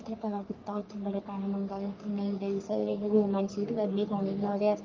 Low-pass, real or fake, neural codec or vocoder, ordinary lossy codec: 7.2 kHz; fake; codec, 44.1 kHz, 3.4 kbps, Pupu-Codec; Opus, 24 kbps